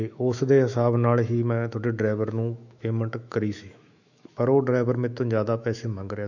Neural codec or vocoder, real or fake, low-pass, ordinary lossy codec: autoencoder, 48 kHz, 128 numbers a frame, DAC-VAE, trained on Japanese speech; fake; 7.2 kHz; none